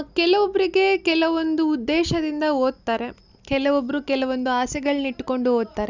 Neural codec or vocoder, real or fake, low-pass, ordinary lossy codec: none; real; 7.2 kHz; none